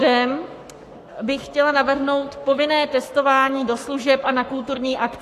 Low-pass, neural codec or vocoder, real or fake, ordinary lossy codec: 14.4 kHz; codec, 44.1 kHz, 7.8 kbps, Pupu-Codec; fake; AAC, 64 kbps